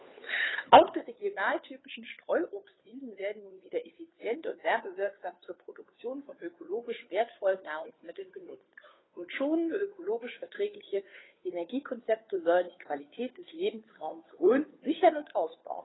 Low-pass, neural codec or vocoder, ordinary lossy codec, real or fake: 7.2 kHz; codec, 16 kHz, 2 kbps, FunCodec, trained on Chinese and English, 25 frames a second; AAC, 16 kbps; fake